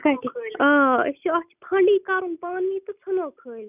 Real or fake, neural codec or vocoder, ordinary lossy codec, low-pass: real; none; none; 3.6 kHz